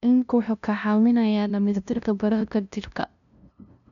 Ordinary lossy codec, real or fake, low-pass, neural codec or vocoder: none; fake; 7.2 kHz; codec, 16 kHz, 0.5 kbps, FunCodec, trained on LibriTTS, 25 frames a second